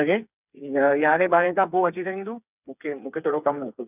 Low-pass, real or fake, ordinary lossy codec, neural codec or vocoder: 3.6 kHz; fake; none; codec, 16 kHz, 4 kbps, FreqCodec, smaller model